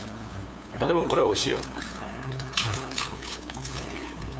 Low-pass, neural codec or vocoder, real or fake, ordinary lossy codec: none; codec, 16 kHz, 2 kbps, FunCodec, trained on LibriTTS, 25 frames a second; fake; none